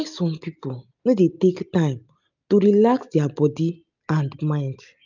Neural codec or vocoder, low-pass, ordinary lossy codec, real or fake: none; 7.2 kHz; none; real